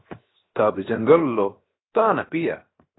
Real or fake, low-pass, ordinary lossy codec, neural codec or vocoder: fake; 7.2 kHz; AAC, 16 kbps; codec, 16 kHz, 0.7 kbps, FocalCodec